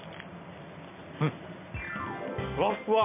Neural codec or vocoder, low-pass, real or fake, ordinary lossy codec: none; 3.6 kHz; real; MP3, 32 kbps